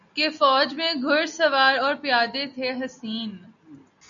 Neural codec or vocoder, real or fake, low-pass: none; real; 7.2 kHz